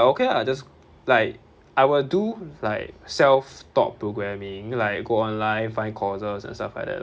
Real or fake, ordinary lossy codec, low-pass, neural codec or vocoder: real; none; none; none